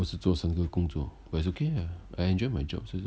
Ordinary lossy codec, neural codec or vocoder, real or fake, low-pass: none; none; real; none